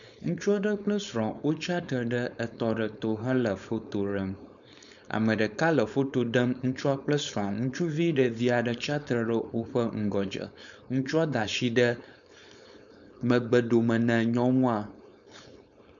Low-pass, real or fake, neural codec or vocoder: 7.2 kHz; fake; codec, 16 kHz, 4.8 kbps, FACodec